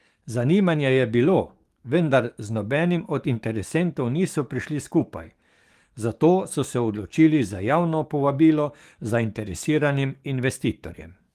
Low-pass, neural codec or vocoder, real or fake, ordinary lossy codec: 14.4 kHz; codec, 44.1 kHz, 7.8 kbps, DAC; fake; Opus, 24 kbps